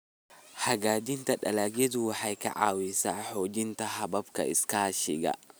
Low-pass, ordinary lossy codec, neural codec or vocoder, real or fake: none; none; none; real